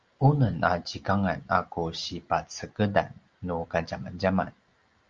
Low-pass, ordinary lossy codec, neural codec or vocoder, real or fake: 7.2 kHz; Opus, 24 kbps; none; real